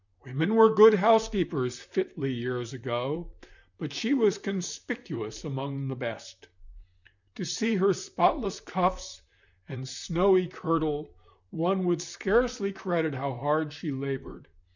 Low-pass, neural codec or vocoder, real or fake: 7.2 kHz; vocoder, 44.1 kHz, 128 mel bands, Pupu-Vocoder; fake